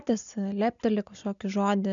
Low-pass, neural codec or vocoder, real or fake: 7.2 kHz; none; real